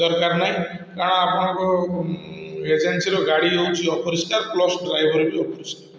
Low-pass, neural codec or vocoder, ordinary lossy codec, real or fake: none; none; none; real